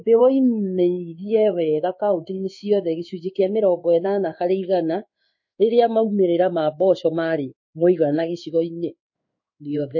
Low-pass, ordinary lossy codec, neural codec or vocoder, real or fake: 7.2 kHz; MP3, 32 kbps; codec, 16 kHz in and 24 kHz out, 1 kbps, XY-Tokenizer; fake